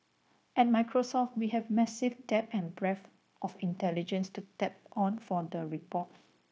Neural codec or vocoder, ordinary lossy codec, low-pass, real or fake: codec, 16 kHz, 0.9 kbps, LongCat-Audio-Codec; none; none; fake